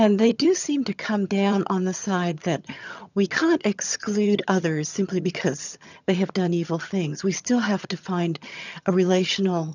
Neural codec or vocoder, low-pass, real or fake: vocoder, 22.05 kHz, 80 mel bands, HiFi-GAN; 7.2 kHz; fake